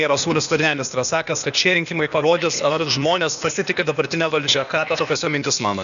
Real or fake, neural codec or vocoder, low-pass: fake; codec, 16 kHz, 0.8 kbps, ZipCodec; 7.2 kHz